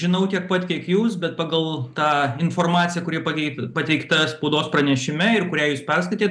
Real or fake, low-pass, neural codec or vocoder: real; 9.9 kHz; none